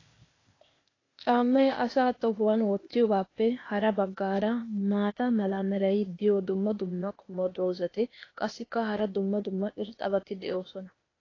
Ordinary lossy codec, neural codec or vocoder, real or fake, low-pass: AAC, 32 kbps; codec, 16 kHz, 0.8 kbps, ZipCodec; fake; 7.2 kHz